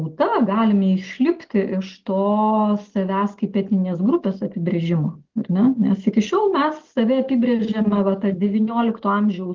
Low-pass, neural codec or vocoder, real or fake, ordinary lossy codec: 7.2 kHz; none; real; Opus, 16 kbps